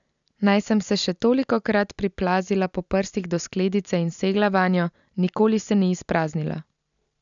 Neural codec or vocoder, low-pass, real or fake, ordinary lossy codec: none; 7.2 kHz; real; none